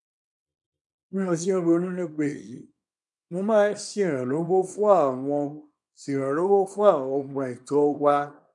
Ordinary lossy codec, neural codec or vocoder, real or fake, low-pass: none; codec, 24 kHz, 0.9 kbps, WavTokenizer, small release; fake; 10.8 kHz